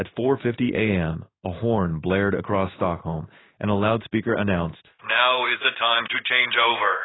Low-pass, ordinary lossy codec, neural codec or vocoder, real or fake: 7.2 kHz; AAC, 16 kbps; codec, 16 kHz in and 24 kHz out, 1 kbps, XY-Tokenizer; fake